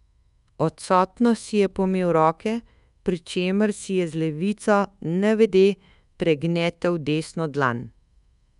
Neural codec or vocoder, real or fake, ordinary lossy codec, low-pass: codec, 24 kHz, 1.2 kbps, DualCodec; fake; none; 10.8 kHz